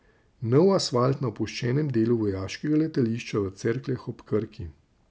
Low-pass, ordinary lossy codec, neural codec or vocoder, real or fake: none; none; none; real